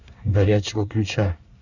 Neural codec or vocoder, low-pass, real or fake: codec, 24 kHz, 1 kbps, SNAC; 7.2 kHz; fake